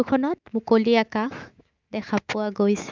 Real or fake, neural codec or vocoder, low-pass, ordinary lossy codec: fake; autoencoder, 48 kHz, 128 numbers a frame, DAC-VAE, trained on Japanese speech; 7.2 kHz; Opus, 32 kbps